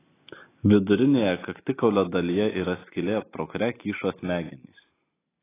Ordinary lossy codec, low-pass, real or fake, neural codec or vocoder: AAC, 16 kbps; 3.6 kHz; real; none